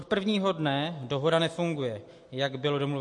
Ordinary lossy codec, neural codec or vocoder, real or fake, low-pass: MP3, 48 kbps; none; real; 10.8 kHz